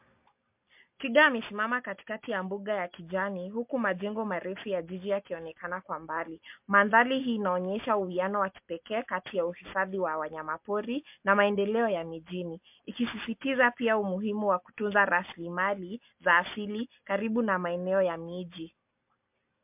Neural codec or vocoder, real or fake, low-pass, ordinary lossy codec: none; real; 3.6 kHz; MP3, 32 kbps